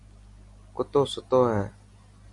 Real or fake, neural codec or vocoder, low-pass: real; none; 10.8 kHz